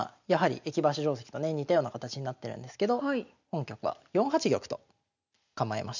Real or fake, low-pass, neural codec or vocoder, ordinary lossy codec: real; 7.2 kHz; none; none